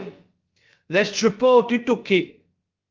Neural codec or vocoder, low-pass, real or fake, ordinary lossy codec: codec, 16 kHz, about 1 kbps, DyCAST, with the encoder's durations; 7.2 kHz; fake; Opus, 32 kbps